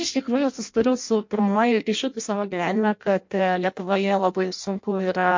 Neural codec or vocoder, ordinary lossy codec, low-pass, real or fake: codec, 16 kHz in and 24 kHz out, 0.6 kbps, FireRedTTS-2 codec; MP3, 48 kbps; 7.2 kHz; fake